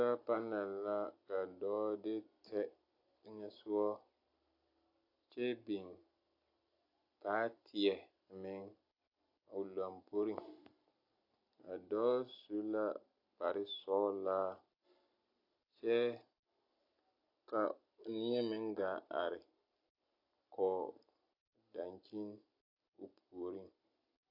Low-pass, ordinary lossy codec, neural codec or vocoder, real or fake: 5.4 kHz; AAC, 32 kbps; vocoder, 44.1 kHz, 128 mel bands every 256 samples, BigVGAN v2; fake